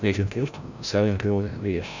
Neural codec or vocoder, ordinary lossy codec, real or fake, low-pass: codec, 16 kHz, 0.5 kbps, FreqCodec, larger model; none; fake; 7.2 kHz